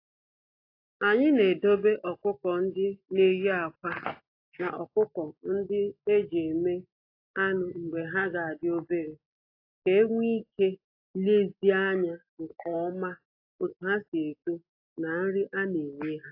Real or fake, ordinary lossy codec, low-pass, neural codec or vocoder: real; AAC, 32 kbps; 5.4 kHz; none